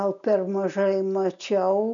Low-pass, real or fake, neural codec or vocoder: 7.2 kHz; real; none